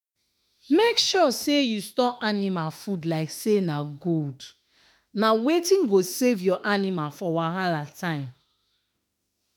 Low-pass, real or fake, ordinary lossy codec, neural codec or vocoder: none; fake; none; autoencoder, 48 kHz, 32 numbers a frame, DAC-VAE, trained on Japanese speech